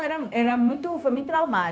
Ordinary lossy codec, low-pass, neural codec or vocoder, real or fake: none; none; codec, 16 kHz, 0.9 kbps, LongCat-Audio-Codec; fake